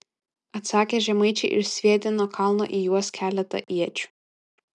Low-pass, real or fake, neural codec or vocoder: 10.8 kHz; real; none